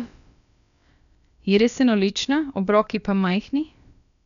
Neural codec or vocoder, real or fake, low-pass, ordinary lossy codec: codec, 16 kHz, about 1 kbps, DyCAST, with the encoder's durations; fake; 7.2 kHz; none